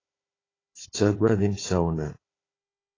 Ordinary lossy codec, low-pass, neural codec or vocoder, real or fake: AAC, 32 kbps; 7.2 kHz; codec, 16 kHz, 4 kbps, FunCodec, trained on Chinese and English, 50 frames a second; fake